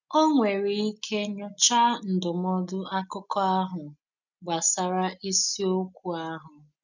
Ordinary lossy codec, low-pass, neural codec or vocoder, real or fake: none; 7.2 kHz; none; real